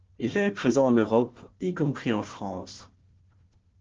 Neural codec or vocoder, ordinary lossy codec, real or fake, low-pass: codec, 16 kHz, 1 kbps, FunCodec, trained on Chinese and English, 50 frames a second; Opus, 16 kbps; fake; 7.2 kHz